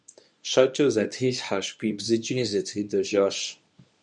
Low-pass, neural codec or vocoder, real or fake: 10.8 kHz; codec, 24 kHz, 0.9 kbps, WavTokenizer, medium speech release version 1; fake